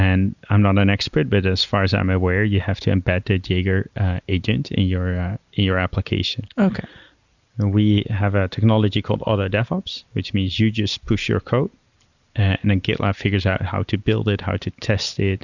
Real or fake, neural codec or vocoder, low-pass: real; none; 7.2 kHz